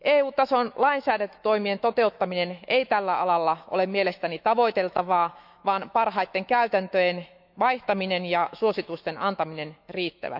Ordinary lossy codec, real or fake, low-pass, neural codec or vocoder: none; fake; 5.4 kHz; autoencoder, 48 kHz, 128 numbers a frame, DAC-VAE, trained on Japanese speech